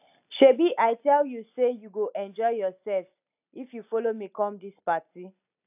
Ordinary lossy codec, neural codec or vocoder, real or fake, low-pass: AAC, 32 kbps; none; real; 3.6 kHz